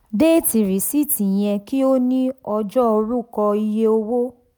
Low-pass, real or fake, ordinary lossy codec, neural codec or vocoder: none; real; none; none